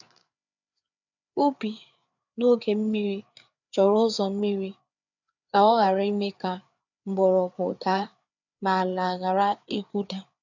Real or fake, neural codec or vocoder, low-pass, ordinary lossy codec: fake; codec, 16 kHz, 4 kbps, FreqCodec, larger model; 7.2 kHz; none